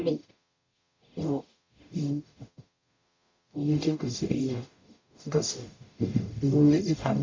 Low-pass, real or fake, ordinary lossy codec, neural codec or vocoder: 7.2 kHz; fake; MP3, 48 kbps; codec, 44.1 kHz, 0.9 kbps, DAC